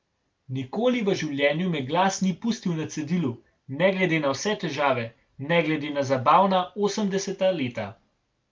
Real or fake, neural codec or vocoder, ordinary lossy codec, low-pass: real; none; Opus, 24 kbps; 7.2 kHz